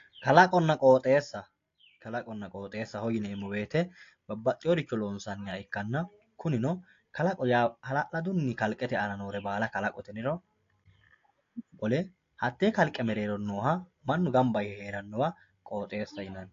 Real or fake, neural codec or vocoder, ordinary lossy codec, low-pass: real; none; AAC, 48 kbps; 7.2 kHz